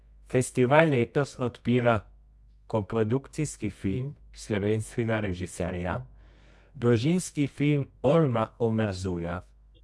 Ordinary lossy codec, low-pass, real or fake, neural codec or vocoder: none; none; fake; codec, 24 kHz, 0.9 kbps, WavTokenizer, medium music audio release